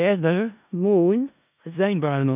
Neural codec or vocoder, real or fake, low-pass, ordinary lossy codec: codec, 16 kHz in and 24 kHz out, 0.4 kbps, LongCat-Audio-Codec, four codebook decoder; fake; 3.6 kHz; none